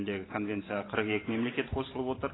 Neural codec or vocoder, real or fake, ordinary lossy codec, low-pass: vocoder, 44.1 kHz, 128 mel bands every 512 samples, BigVGAN v2; fake; AAC, 16 kbps; 7.2 kHz